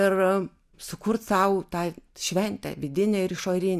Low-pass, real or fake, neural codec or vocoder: 14.4 kHz; real; none